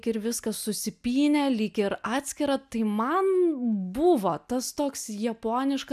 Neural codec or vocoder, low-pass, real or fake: none; 14.4 kHz; real